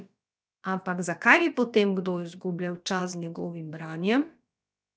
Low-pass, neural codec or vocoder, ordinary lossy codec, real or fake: none; codec, 16 kHz, about 1 kbps, DyCAST, with the encoder's durations; none; fake